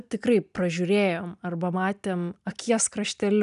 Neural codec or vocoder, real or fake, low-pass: none; real; 10.8 kHz